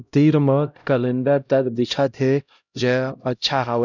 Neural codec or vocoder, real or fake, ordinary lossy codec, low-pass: codec, 16 kHz, 0.5 kbps, X-Codec, HuBERT features, trained on LibriSpeech; fake; none; 7.2 kHz